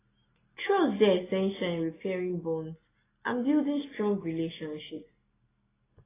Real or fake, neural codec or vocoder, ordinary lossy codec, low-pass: real; none; AAC, 16 kbps; 3.6 kHz